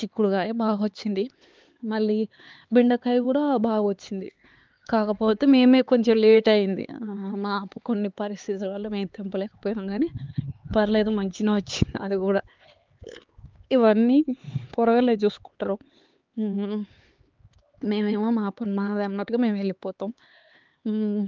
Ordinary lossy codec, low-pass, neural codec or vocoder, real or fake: Opus, 24 kbps; 7.2 kHz; codec, 16 kHz, 4 kbps, X-Codec, HuBERT features, trained on LibriSpeech; fake